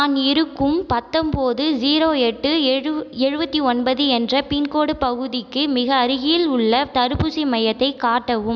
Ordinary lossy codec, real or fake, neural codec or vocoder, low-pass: none; real; none; none